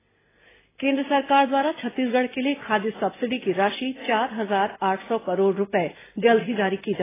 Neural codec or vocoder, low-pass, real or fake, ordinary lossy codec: none; 3.6 kHz; real; AAC, 16 kbps